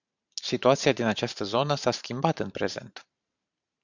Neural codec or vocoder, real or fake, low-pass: vocoder, 44.1 kHz, 128 mel bands every 512 samples, BigVGAN v2; fake; 7.2 kHz